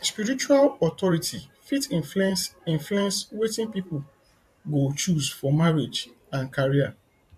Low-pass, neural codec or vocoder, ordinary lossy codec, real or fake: 14.4 kHz; vocoder, 44.1 kHz, 128 mel bands every 512 samples, BigVGAN v2; MP3, 64 kbps; fake